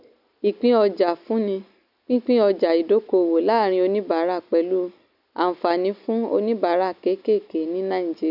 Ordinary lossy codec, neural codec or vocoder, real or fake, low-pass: none; none; real; 5.4 kHz